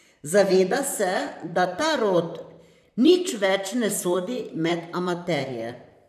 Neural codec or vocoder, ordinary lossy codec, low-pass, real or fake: vocoder, 44.1 kHz, 128 mel bands, Pupu-Vocoder; none; 14.4 kHz; fake